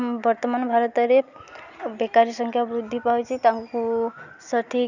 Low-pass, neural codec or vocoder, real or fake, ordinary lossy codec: 7.2 kHz; none; real; none